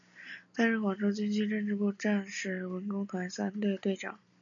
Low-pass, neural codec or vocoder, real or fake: 7.2 kHz; none; real